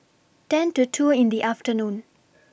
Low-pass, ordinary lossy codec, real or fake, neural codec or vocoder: none; none; real; none